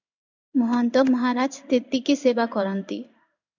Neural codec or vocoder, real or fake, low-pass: codec, 16 kHz in and 24 kHz out, 1 kbps, XY-Tokenizer; fake; 7.2 kHz